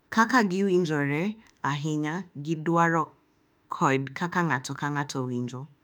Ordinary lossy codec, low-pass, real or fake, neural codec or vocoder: none; 19.8 kHz; fake; autoencoder, 48 kHz, 32 numbers a frame, DAC-VAE, trained on Japanese speech